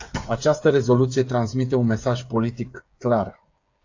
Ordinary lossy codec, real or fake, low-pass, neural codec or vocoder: AAC, 48 kbps; fake; 7.2 kHz; codec, 16 kHz, 8 kbps, FreqCodec, smaller model